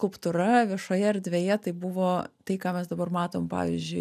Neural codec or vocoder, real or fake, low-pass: none; real; 14.4 kHz